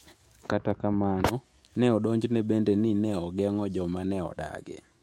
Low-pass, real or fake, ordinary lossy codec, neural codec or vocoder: 19.8 kHz; fake; MP3, 64 kbps; autoencoder, 48 kHz, 128 numbers a frame, DAC-VAE, trained on Japanese speech